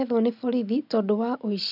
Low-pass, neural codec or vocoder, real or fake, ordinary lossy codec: 5.4 kHz; none; real; none